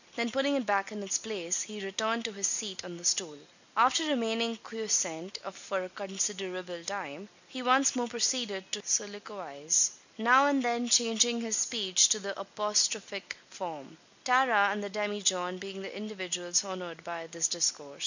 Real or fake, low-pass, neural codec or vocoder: real; 7.2 kHz; none